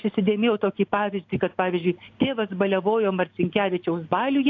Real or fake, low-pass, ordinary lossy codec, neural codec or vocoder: real; 7.2 kHz; AAC, 48 kbps; none